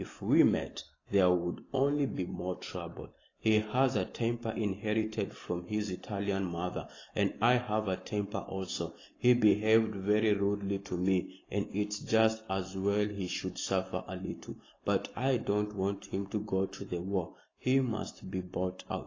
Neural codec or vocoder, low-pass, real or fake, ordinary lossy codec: none; 7.2 kHz; real; AAC, 32 kbps